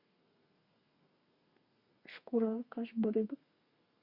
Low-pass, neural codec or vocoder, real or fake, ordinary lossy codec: 5.4 kHz; codec, 32 kHz, 1.9 kbps, SNAC; fake; Opus, 64 kbps